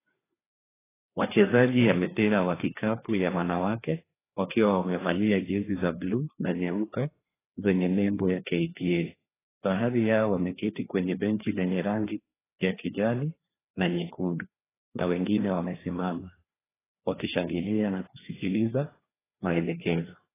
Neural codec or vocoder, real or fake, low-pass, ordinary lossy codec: codec, 16 kHz, 2 kbps, FreqCodec, larger model; fake; 3.6 kHz; AAC, 16 kbps